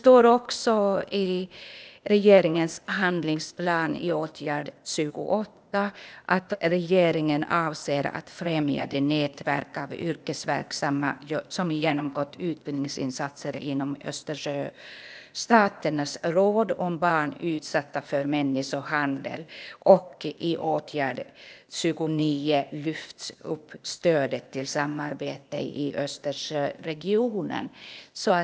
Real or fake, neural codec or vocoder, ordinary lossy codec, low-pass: fake; codec, 16 kHz, 0.8 kbps, ZipCodec; none; none